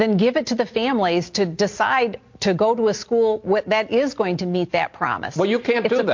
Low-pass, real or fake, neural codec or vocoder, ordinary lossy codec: 7.2 kHz; real; none; MP3, 48 kbps